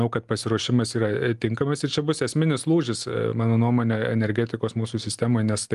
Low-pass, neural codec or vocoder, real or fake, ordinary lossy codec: 10.8 kHz; none; real; Opus, 32 kbps